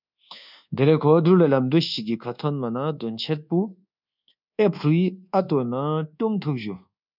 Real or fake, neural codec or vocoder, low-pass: fake; codec, 24 kHz, 1.2 kbps, DualCodec; 5.4 kHz